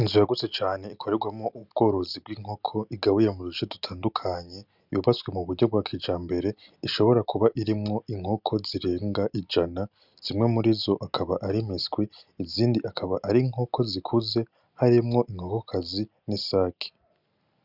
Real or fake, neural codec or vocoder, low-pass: real; none; 5.4 kHz